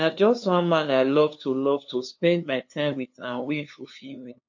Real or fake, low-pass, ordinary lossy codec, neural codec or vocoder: fake; 7.2 kHz; MP3, 48 kbps; codec, 16 kHz, 2 kbps, FunCodec, trained on LibriTTS, 25 frames a second